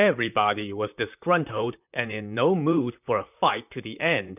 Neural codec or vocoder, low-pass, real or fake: vocoder, 44.1 kHz, 128 mel bands, Pupu-Vocoder; 3.6 kHz; fake